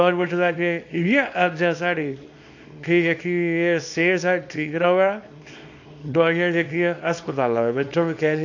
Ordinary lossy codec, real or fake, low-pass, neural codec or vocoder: AAC, 48 kbps; fake; 7.2 kHz; codec, 24 kHz, 0.9 kbps, WavTokenizer, small release